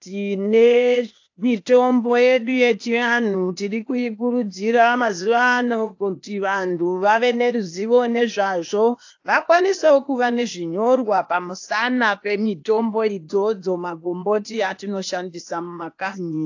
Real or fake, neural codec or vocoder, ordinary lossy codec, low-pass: fake; codec, 16 kHz, 0.8 kbps, ZipCodec; AAC, 48 kbps; 7.2 kHz